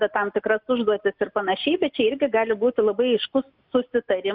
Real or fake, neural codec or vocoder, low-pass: real; none; 5.4 kHz